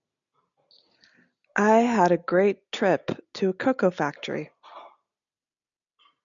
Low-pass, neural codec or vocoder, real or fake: 7.2 kHz; none; real